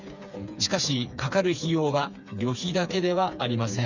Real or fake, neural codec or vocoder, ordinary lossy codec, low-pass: fake; codec, 16 kHz, 4 kbps, FreqCodec, smaller model; none; 7.2 kHz